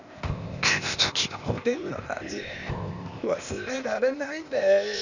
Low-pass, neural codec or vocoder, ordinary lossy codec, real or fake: 7.2 kHz; codec, 16 kHz, 0.8 kbps, ZipCodec; none; fake